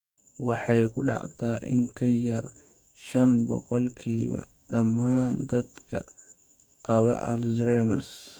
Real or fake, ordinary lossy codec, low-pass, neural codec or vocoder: fake; none; 19.8 kHz; codec, 44.1 kHz, 2.6 kbps, DAC